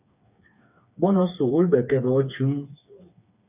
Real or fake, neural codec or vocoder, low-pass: fake; codec, 16 kHz, 4 kbps, FreqCodec, smaller model; 3.6 kHz